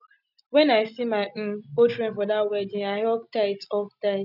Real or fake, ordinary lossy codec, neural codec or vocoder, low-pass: real; none; none; 5.4 kHz